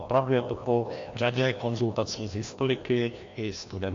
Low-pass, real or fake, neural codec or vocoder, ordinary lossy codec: 7.2 kHz; fake; codec, 16 kHz, 1 kbps, FreqCodec, larger model; AAC, 48 kbps